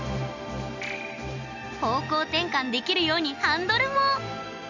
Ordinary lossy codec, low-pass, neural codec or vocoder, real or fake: none; 7.2 kHz; none; real